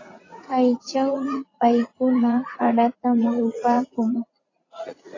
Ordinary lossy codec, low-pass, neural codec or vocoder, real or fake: AAC, 32 kbps; 7.2 kHz; vocoder, 44.1 kHz, 80 mel bands, Vocos; fake